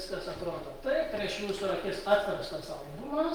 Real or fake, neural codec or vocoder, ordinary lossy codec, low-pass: real; none; Opus, 24 kbps; 19.8 kHz